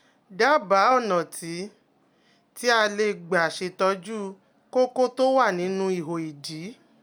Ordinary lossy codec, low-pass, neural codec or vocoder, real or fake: none; none; none; real